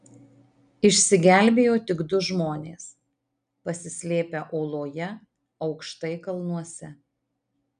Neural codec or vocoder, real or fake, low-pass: none; real; 9.9 kHz